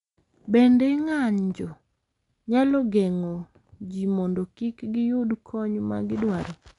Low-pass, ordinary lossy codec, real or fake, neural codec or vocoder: 10.8 kHz; none; real; none